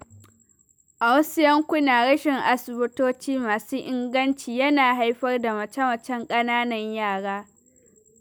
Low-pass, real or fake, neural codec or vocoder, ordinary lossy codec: none; real; none; none